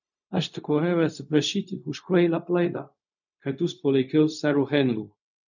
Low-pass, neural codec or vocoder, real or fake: 7.2 kHz; codec, 16 kHz, 0.4 kbps, LongCat-Audio-Codec; fake